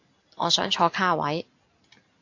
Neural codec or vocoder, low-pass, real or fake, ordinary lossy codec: none; 7.2 kHz; real; AAC, 48 kbps